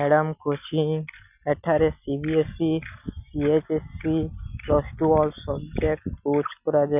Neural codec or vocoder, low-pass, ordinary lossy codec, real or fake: none; 3.6 kHz; none; real